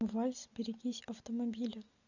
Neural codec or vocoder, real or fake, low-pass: none; real; 7.2 kHz